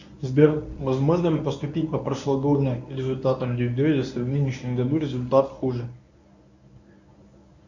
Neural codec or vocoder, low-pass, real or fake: codec, 24 kHz, 0.9 kbps, WavTokenizer, medium speech release version 1; 7.2 kHz; fake